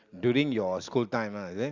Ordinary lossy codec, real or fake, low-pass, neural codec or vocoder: Opus, 64 kbps; real; 7.2 kHz; none